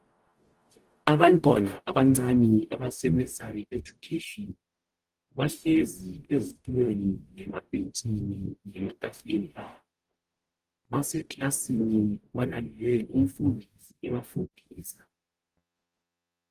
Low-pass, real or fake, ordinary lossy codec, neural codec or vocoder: 14.4 kHz; fake; Opus, 24 kbps; codec, 44.1 kHz, 0.9 kbps, DAC